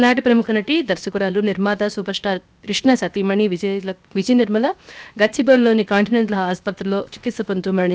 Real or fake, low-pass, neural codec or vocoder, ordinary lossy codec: fake; none; codec, 16 kHz, 0.7 kbps, FocalCodec; none